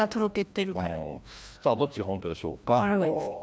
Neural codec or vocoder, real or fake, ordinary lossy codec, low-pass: codec, 16 kHz, 1 kbps, FreqCodec, larger model; fake; none; none